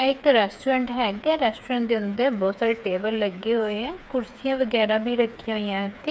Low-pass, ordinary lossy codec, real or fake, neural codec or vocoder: none; none; fake; codec, 16 kHz, 4 kbps, FreqCodec, smaller model